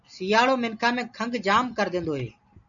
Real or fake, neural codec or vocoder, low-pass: real; none; 7.2 kHz